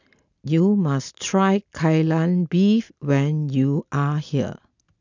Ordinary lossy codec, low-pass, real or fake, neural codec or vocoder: none; 7.2 kHz; real; none